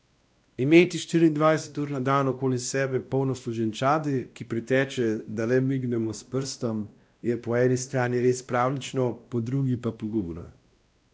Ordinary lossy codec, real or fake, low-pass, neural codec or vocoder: none; fake; none; codec, 16 kHz, 1 kbps, X-Codec, WavLM features, trained on Multilingual LibriSpeech